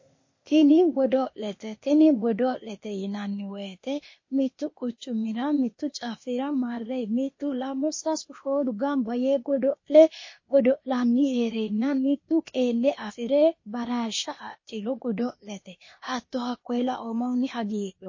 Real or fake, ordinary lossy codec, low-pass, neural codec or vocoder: fake; MP3, 32 kbps; 7.2 kHz; codec, 16 kHz, 0.8 kbps, ZipCodec